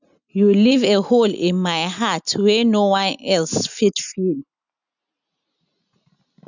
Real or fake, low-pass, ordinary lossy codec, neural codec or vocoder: real; 7.2 kHz; none; none